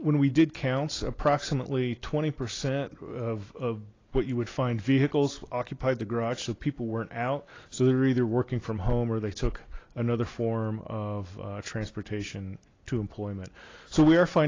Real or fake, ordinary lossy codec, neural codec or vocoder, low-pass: real; AAC, 32 kbps; none; 7.2 kHz